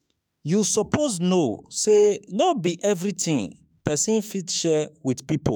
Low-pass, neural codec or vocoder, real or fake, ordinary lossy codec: none; autoencoder, 48 kHz, 32 numbers a frame, DAC-VAE, trained on Japanese speech; fake; none